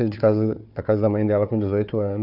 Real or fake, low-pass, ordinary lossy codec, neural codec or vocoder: fake; 5.4 kHz; none; codec, 16 kHz, 4 kbps, FunCodec, trained on Chinese and English, 50 frames a second